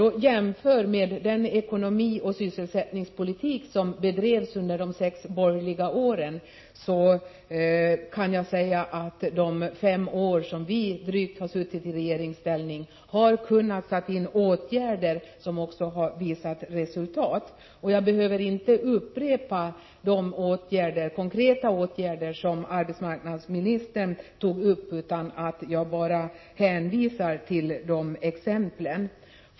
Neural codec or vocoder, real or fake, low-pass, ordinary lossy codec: none; real; 7.2 kHz; MP3, 24 kbps